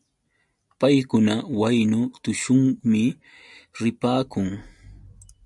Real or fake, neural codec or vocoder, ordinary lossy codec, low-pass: real; none; MP3, 96 kbps; 10.8 kHz